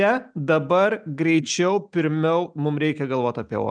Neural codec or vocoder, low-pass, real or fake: none; 9.9 kHz; real